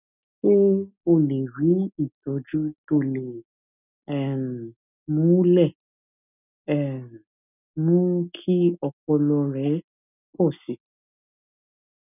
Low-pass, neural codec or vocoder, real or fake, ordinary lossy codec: 3.6 kHz; none; real; none